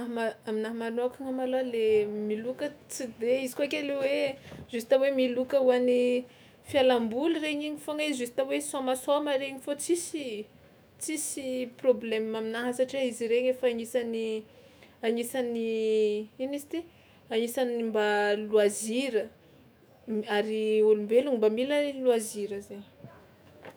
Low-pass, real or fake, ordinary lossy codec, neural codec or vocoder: none; fake; none; autoencoder, 48 kHz, 128 numbers a frame, DAC-VAE, trained on Japanese speech